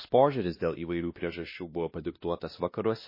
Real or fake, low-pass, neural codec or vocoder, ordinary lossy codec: fake; 5.4 kHz; codec, 16 kHz, 1 kbps, X-Codec, WavLM features, trained on Multilingual LibriSpeech; MP3, 24 kbps